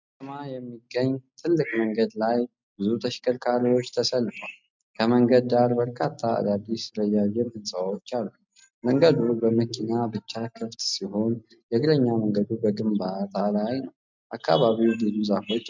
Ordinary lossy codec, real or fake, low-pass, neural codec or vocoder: MP3, 64 kbps; real; 7.2 kHz; none